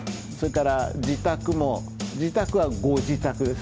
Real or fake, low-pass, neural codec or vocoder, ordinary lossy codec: real; none; none; none